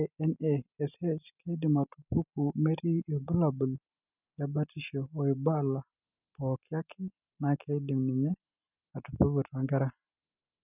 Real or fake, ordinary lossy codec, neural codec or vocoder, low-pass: real; none; none; 3.6 kHz